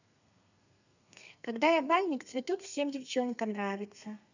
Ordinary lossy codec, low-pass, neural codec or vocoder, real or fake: none; 7.2 kHz; codec, 32 kHz, 1.9 kbps, SNAC; fake